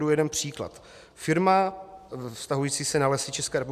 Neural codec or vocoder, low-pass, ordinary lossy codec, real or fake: none; 14.4 kHz; MP3, 96 kbps; real